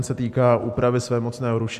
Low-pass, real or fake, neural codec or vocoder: 14.4 kHz; real; none